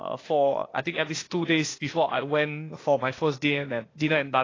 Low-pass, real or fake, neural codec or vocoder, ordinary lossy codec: 7.2 kHz; fake; codec, 16 kHz, 0.8 kbps, ZipCodec; AAC, 32 kbps